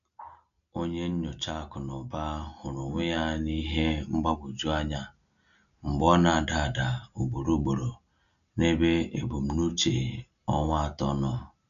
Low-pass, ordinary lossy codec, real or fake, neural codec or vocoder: 7.2 kHz; none; real; none